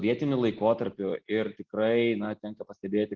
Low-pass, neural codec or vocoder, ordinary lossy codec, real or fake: 7.2 kHz; none; Opus, 16 kbps; real